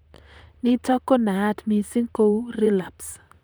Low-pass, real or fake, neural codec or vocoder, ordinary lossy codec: none; fake; vocoder, 44.1 kHz, 128 mel bands, Pupu-Vocoder; none